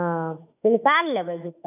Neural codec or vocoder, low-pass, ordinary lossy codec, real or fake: autoencoder, 48 kHz, 32 numbers a frame, DAC-VAE, trained on Japanese speech; 3.6 kHz; none; fake